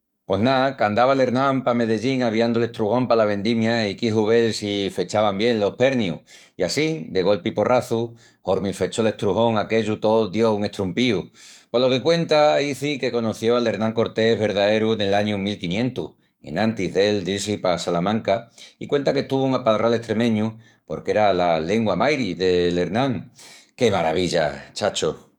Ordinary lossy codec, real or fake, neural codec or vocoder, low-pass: none; fake; codec, 44.1 kHz, 7.8 kbps, DAC; 19.8 kHz